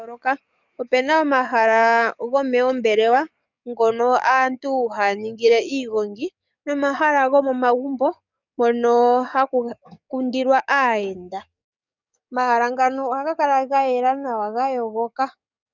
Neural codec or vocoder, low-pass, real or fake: codec, 44.1 kHz, 7.8 kbps, DAC; 7.2 kHz; fake